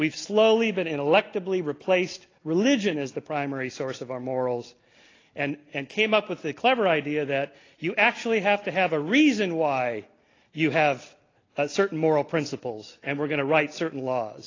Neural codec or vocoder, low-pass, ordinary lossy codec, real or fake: none; 7.2 kHz; AAC, 32 kbps; real